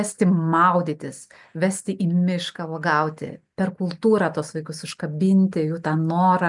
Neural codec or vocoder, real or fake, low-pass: none; real; 10.8 kHz